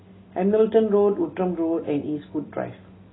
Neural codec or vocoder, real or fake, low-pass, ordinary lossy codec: none; real; 7.2 kHz; AAC, 16 kbps